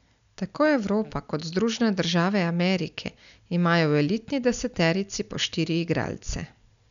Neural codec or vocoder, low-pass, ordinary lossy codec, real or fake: none; 7.2 kHz; none; real